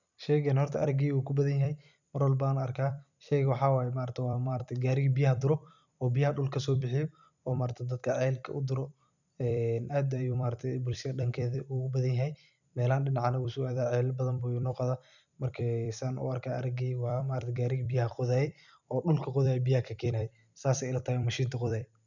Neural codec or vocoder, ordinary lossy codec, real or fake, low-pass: vocoder, 44.1 kHz, 128 mel bands every 256 samples, BigVGAN v2; none; fake; 7.2 kHz